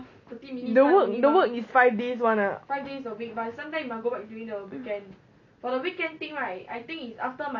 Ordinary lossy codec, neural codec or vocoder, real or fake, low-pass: none; none; real; 7.2 kHz